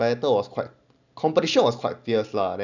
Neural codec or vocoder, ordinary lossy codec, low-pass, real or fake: none; none; 7.2 kHz; real